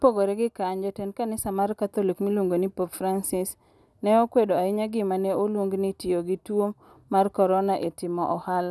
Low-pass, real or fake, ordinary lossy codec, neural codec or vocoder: none; real; none; none